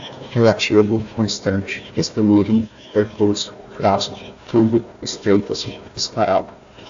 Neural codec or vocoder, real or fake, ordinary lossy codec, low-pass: codec, 16 kHz, 1 kbps, FunCodec, trained on Chinese and English, 50 frames a second; fake; AAC, 64 kbps; 7.2 kHz